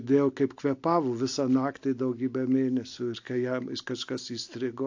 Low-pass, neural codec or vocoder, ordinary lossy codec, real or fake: 7.2 kHz; none; AAC, 48 kbps; real